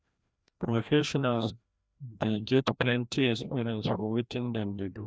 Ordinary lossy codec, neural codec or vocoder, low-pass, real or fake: none; codec, 16 kHz, 1 kbps, FreqCodec, larger model; none; fake